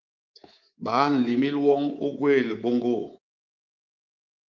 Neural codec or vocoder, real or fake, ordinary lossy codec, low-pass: codec, 24 kHz, 3.1 kbps, DualCodec; fake; Opus, 32 kbps; 7.2 kHz